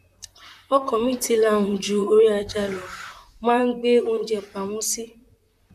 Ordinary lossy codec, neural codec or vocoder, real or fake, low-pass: none; vocoder, 44.1 kHz, 128 mel bands, Pupu-Vocoder; fake; 14.4 kHz